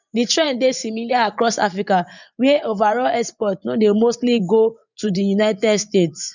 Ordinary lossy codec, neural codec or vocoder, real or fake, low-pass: none; vocoder, 24 kHz, 100 mel bands, Vocos; fake; 7.2 kHz